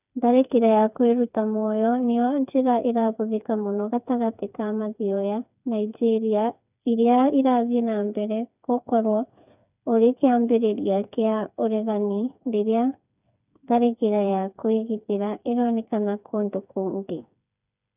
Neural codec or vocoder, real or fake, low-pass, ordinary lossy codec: codec, 16 kHz, 4 kbps, FreqCodec, smaller model; fake; 3.6 kHz; none